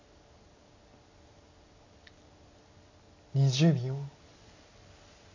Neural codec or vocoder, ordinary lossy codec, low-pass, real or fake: none; AAC, 48 kbps; 7.2 kHz; real